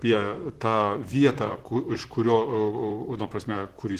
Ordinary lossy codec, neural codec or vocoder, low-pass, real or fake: Opus, 16 kbps; vocoder, 44.1 kHz, 128 mel bands, Pupu-Vocoder; 14.4 kHz; fake